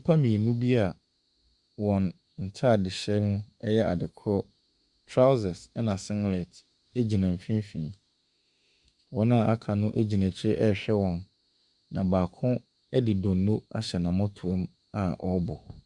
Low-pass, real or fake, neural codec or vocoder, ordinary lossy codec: 10.8 kHz; fake; autoencoder, 48 kHz, 32 numbers a frame, DAC-VAE, trained on Japanese speech; Opus, 64 kbps